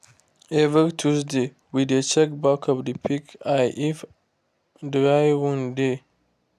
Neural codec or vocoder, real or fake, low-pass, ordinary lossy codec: none; real; 14.4 kHz; none